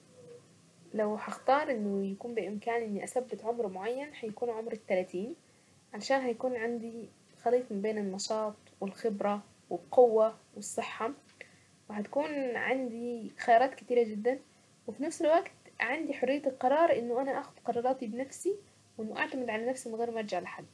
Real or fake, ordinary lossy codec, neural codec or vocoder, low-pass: real; none; none; none